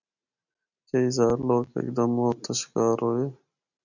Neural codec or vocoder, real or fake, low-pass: none; real; 7.2 kHz